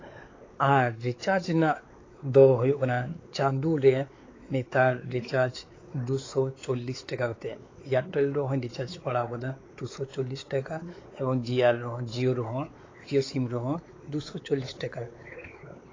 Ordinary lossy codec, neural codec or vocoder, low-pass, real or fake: AAC, 32 kbps; codec, 16 kHz, 4 kbps, X-Codec, WavLM features, trained on Multilingual LibriSpeech; 7.2 kHz; fake